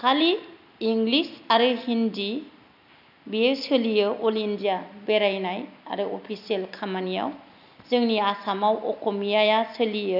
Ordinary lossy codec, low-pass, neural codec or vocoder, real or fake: none; 5.4 kHz; none; real